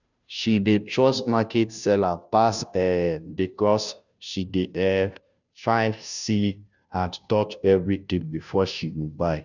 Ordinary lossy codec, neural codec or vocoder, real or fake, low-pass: none; codec, 16 kHz, 0.5 kbps, FunCodec, trained on Chinese and English, 25 frames a second; fake; 7.2 kHz